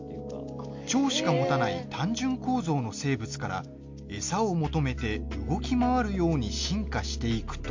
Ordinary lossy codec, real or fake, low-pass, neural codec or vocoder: MP3, 48 kbps; real; 7.2 kHz; none